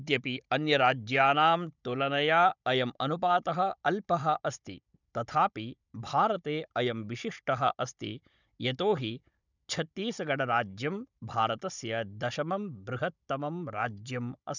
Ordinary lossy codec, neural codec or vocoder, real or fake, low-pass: none; codec, 16 kHz, 16 kbps, FunCodec, trained on Chinese and English, 50 frames a second; fake; 7.2 kHz